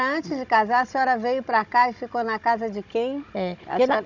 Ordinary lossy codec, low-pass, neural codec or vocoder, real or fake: none; 7.2 kHz; codec, 16 kHz, 16 kbps, FunCodec, trained on Chinese and English, 50 frames a second; fake